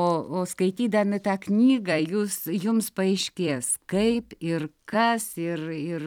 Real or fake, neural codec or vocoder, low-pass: fake; vocoder, 44.1 kHz, 128 mel bands every 256 samples, BigVGAN v2; 19.8 kHz